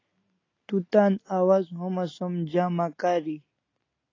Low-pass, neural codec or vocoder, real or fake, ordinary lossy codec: 7.2 kHz; none; real; AAC, 32 kbps